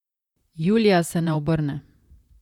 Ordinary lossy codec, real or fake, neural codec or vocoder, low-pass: none; fake; vocoder, 44.1 kHz, 128 mel bands, Pupu-Vocoder; 19.8 kHz